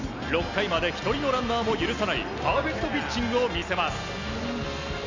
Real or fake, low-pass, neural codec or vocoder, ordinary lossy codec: real; 7.2 kHz; none; MP3, 64 kbps